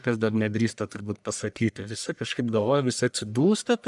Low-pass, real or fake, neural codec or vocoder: 10.8 kHz; fake; codec, 44.1 kHz, 1.7 kbps, Pupu-Codec